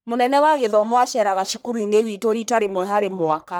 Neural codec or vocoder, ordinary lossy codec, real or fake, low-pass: codec, 44.1 kHz, 1.7 kbps, Pupu-Codec; none; fake; none